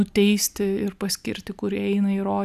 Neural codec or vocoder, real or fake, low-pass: none; real; 14.4 kHz